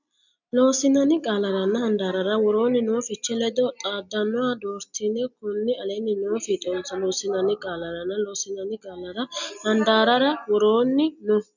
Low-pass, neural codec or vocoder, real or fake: 7.2 kHz; none; real